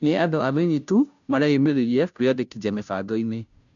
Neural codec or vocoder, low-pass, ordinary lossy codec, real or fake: codec, 16 kHz, 0.5 kbps, FunCodec, trained on Chinese and English, 25 frames a second; 7.2 kHz; none; fake